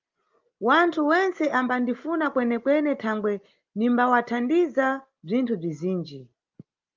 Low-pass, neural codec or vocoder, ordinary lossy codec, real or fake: 7.2 kHz; none; Opus, 24 kbps; real